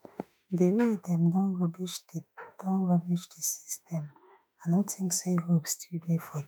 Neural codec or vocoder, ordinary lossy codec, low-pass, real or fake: autoencoder, 48 kHz, 32 numbers a frame, DAC-VAE, trained on Japanese speech; none; none; fake